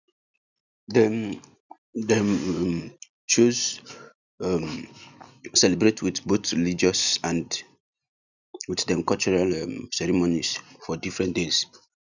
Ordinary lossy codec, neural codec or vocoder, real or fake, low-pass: none; none; real; 7.2 kHz